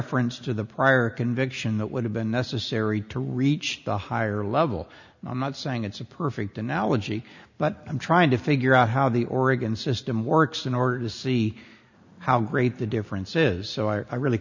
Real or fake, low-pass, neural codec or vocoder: real; 7.2 kHz; none